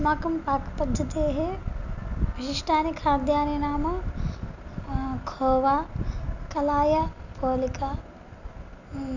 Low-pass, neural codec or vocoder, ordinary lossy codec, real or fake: 7.2 kHz; none; none; real